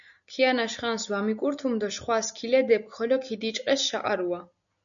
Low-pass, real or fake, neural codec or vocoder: 7.2 kHz; real; none